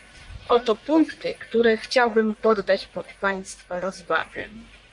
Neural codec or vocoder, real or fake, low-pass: codec, 44.1 kHz, 1.7 kbps, Pupu-Codec; fake; 10.8 kHz